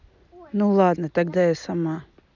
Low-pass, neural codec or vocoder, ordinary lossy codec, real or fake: 7.2 kHz; none; none; real